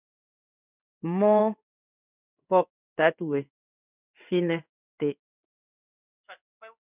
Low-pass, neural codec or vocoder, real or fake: 3.6 kHz; codec, 16 kHz in and 24 kHz out, 1 kbps, XY-Tokenizer; fake